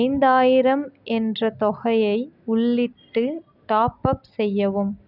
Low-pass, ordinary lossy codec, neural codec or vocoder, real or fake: 5.4 kHz; none; none; real